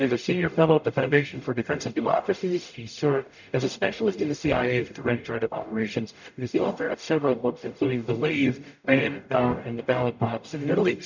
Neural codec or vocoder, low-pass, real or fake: codec, 44.1 kHz, 0.9 kbps, DAC; 7.2 kHz; fake